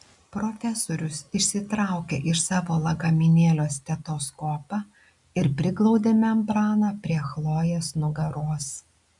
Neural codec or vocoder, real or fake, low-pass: none; real; 10.8 kHz